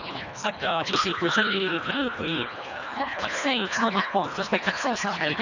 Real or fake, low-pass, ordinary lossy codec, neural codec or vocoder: fake; 7.2 kHz; none; codec, 24 kHz, 1.5 kbps, HILCodec